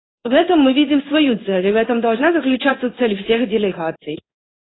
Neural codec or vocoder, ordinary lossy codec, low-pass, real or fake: codec, 16 kHz in and 24 kHz out, 1 kbps, XY-Tokenizer; AAC, 16 kbps; 7.2 kHz; fake